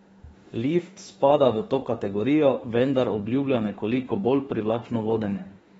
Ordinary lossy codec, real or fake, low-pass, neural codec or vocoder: AAC, 24 kbps; fake; 19.8 kHz; autoencoder, 48 kHz, 32 numbers a frame, DAC-VAE, trained on Japanese speech